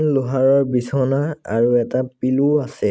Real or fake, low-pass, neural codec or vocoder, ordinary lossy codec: real; none; none; none